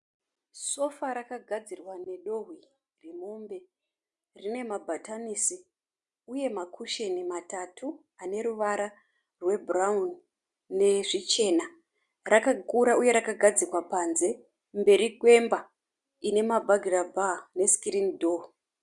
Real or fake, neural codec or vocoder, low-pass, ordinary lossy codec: real; none; 10.8 kHz; Opus, 64 kbps